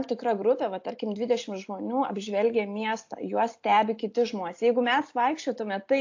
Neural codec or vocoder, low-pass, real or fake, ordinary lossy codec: none; 7.2 kHz; real; AAC, 48 kbps